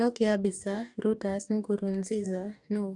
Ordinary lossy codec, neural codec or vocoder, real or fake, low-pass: none; codec, 44.1 kHz, 2.6 kbps, DAC; fake; 10.8 kHz